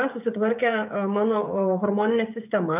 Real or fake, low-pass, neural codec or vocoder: fake; 3.6 kHz; codec, 16 kHz, 16 kbps, FreqCodec, smaller model